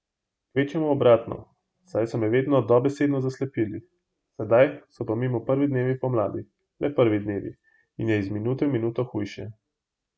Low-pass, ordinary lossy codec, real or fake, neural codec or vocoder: none; none; real; none